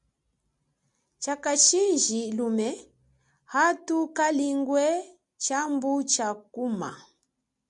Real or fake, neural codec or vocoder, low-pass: real; none; 10.8 kHz